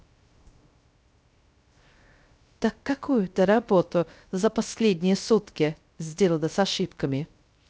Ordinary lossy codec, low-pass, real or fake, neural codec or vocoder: none; none; fake; codec, 16 kHz, 0.3 kbps, FocalCodec